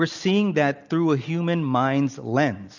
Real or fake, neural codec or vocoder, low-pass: real; none; 7.2 kHz